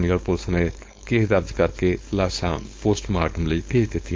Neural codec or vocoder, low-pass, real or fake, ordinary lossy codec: codec, 16 kHz, 4.8 kbps, FACodec; none; fake; none